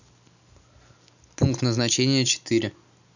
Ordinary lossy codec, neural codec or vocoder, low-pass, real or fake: none; none; 7.2 kHz; real